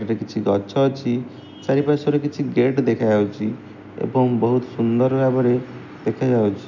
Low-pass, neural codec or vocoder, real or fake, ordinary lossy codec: 7.2 kHz; none; real; none